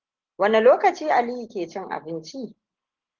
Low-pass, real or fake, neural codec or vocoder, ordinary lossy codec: 7.2 kHz; real; none; Opus, 16 kbps